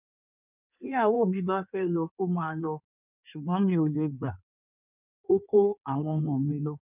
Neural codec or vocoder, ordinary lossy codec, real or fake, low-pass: codec, 16 kHz in and 24 kHz out, 1.1 kbps, FireRedTTS-2 codec; none; fake; 3.6 kHz